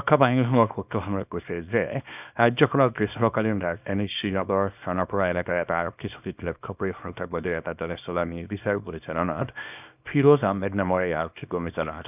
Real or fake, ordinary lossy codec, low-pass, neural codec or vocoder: fake; none; 3.6 kHz; codec, 24 kHz, 0.9 kbps, WavTokenizer, small release